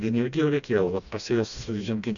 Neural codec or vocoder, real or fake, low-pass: codec, 16 kHz, 1 kbps, FreqCodec, smaller model; fake; 7.2 kHz